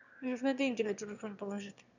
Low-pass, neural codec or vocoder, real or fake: 7.2 kHz; autoencoder, 22.05 kHz, a latent of 192 numbers a frame, VITS, trained on one speaker; fake